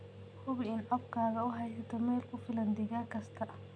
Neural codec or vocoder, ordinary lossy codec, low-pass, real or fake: none; none; 9.9 kHz; real